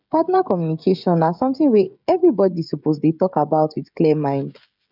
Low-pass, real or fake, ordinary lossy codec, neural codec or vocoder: 5.4 kHz; fake; none; codec, 16 kHz, 16 kbps, FreqCodec, smaller model